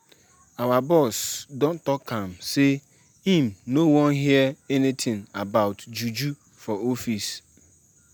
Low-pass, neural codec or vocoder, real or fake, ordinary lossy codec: none; none; real; none